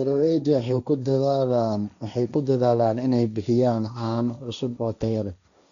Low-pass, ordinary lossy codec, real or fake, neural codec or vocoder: 7.2 kHz; none; fake; codec, 16 kHz, 1.1 kbps, Voila-Tokenizer